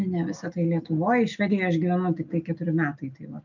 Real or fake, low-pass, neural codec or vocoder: real; 7.2 kHz; none